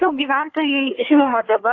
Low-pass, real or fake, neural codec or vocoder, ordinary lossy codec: 7.2 kHz; fake; codec, 24 kHz, 1 kbps, SNAC; AAC, 48 kbps